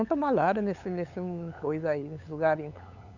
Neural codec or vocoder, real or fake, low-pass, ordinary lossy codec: codec, 16 kHz, 8 kbps, FunCodec, trained on LibriTTS, 25 frames a second; fake; 7.2 kHz; none